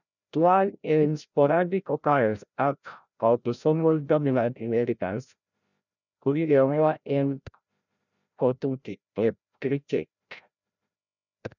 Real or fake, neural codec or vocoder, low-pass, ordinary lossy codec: fake; codec, 16 kHz, 0.5 kbps, FreqCodec, larger model; 7.2 kHz; none